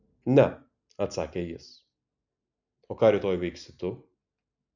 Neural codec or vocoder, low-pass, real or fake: none; 7.2 kHz; real